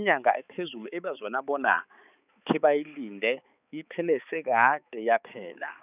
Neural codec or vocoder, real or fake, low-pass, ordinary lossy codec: codec, 16 kHz, 4 kbps, X-Codec, HuBERT features, trained on balanced general audio; fake; 3.6 kHz; none